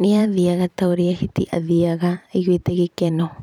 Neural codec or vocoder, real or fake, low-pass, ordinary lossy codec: vocoder, 44.1 kHz, 128 mel bands every 512 samples, BigVGAN v2; fake; 19.8 kHz; none